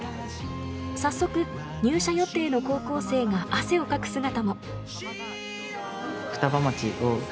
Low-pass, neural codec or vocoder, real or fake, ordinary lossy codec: none; none; real; none